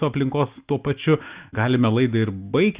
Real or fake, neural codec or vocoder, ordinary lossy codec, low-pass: real; none; Opus, 64 kbps; 3.6 kHz